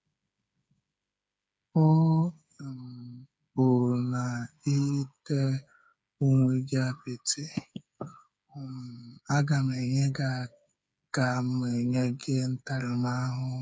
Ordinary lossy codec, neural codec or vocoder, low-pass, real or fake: none; codec, 16 kHz, 8 kbps, FreqCodec, smaller model; none; fake